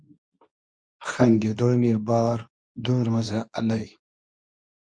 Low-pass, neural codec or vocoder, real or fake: 9.9 kHz; codec, 24 kHz, 0.9 kbps, WavTokenizer, medium speech release version 2; fake